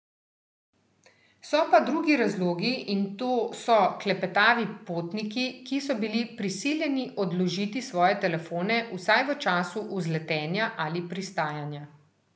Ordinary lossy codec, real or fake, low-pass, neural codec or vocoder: none; real; none; none